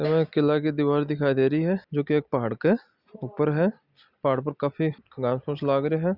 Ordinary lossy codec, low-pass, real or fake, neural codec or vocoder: Opus, 64 kbps; 5.4 kHz; real; none